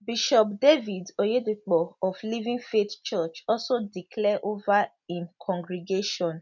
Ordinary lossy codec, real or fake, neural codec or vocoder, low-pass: none; real; none; 7.2 kHz